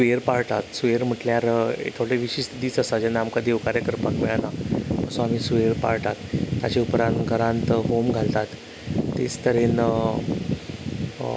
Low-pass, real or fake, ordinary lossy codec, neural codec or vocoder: none; real; none; none